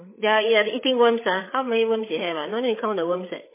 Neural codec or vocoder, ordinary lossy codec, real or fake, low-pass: codec, 16 kHz, 8 kbps, FreqCodec, larger model; MP3, 16 kbps; fake; 3.6 kHz